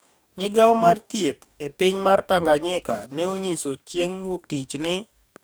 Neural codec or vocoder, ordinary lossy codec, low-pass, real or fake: codec, 44.1 kHz, 2.6 kbps, DAC; none; none; fake